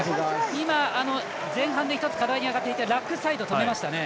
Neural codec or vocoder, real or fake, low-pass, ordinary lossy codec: none; real; none; none